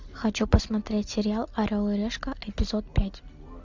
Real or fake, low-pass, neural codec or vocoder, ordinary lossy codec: real; 7.2 kHz; none; MP3, 64 kbps